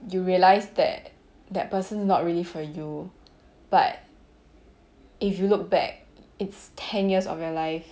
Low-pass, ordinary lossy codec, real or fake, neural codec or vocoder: none; none; real; none